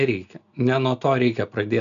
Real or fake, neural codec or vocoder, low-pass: real; none; 7.2 kHz